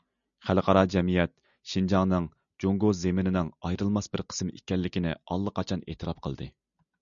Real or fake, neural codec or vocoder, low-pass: real; none; 7.2 kHz